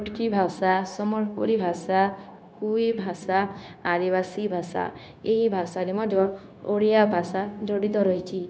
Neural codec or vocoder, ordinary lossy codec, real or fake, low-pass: codec, 16 kHz, 0.9 kbps, LongCat-Audio-Codec; none; fake; none